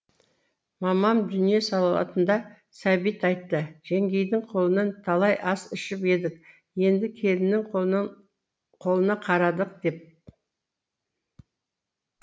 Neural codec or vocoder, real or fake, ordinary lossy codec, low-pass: none; real; none; none